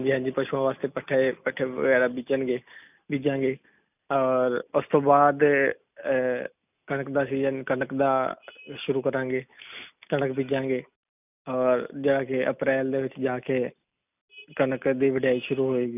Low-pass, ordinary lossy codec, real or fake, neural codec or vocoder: 3.6 kHz; none; real; none